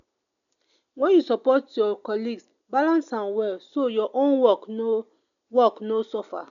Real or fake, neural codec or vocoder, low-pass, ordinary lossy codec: real; none; 7.2 kHz; none